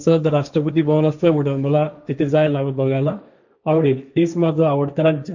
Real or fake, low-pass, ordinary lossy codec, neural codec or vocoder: fake; 7.2 kHz; none; codec, 16 kHz, 1.1 kbps, Voila-Tokenizer